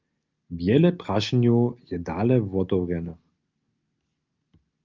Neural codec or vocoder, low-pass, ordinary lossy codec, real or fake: none; 7.2 kHz; Opus, 24 kbps; real